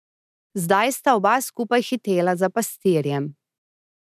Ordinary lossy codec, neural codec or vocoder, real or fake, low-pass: none; none; real; 14.4 kHz